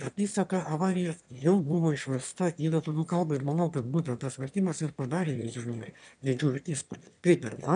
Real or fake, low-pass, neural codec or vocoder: fake; 9.9 kHz; autoencoder, 22.05 kHz, a latent of 192 numbers a frame, VITS, trained on one speaker